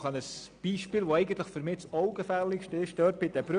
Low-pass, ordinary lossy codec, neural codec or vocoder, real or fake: 9.9 kHz; none; none; real